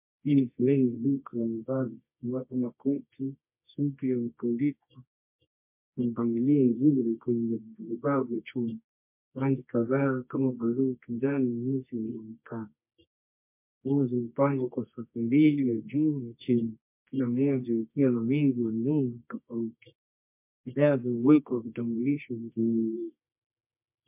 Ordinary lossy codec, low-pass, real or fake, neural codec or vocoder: MP3, 32 kbps; 3.6 kHz; fake; codec, 24 kHz, 0.9 kbps, WavTokenizer, medium music audio release